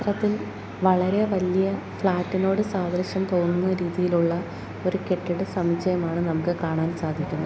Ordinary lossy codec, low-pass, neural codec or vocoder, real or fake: none; none; none; real